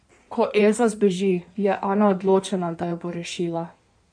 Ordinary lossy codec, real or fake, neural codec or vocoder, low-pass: none; fake; codec, 16 kHz in and 24 kHz out, 1.1 kbps, FireRedTTS-2 codec; 9.9 kHz